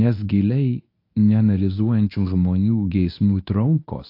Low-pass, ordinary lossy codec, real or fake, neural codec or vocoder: 5.4 kHz; AAC, 32 kbps; fake; codec, 24 kHz, 0.9 kbps, WavTokenizer, medium speech release version 1